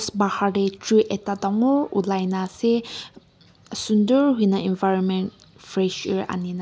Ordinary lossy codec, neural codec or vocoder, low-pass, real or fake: none; none; none; real